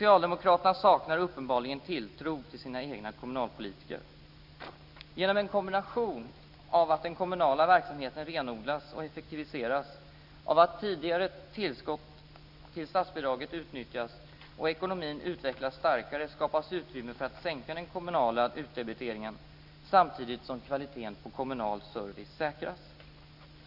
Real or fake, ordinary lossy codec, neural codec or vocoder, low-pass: real; none; none; 5.4 kHz